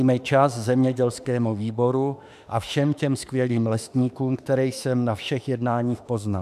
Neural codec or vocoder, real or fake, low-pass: autoencoder, 48 kHz, 32 numbers a frame, DAC-VAE, trained on Japanese speech; fake; 14.4 kHz